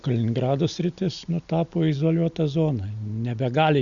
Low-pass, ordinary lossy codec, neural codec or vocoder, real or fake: 7.2 kHz; Opus, 64 kbps; none; real